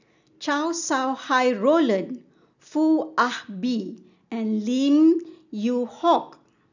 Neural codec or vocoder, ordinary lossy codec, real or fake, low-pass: none; none; real; 7.2 kHz